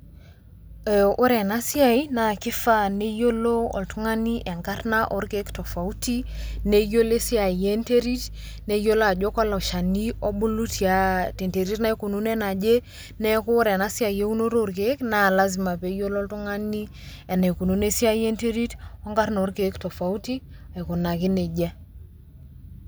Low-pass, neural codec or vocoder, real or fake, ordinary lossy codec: none; none; real; none